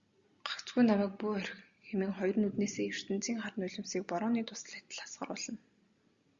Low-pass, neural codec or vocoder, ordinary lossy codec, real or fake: 7.2 kHz; none; Opus, 64 kbps; real